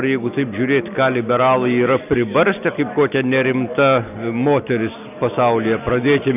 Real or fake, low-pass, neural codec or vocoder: real; 3.6 kHz; none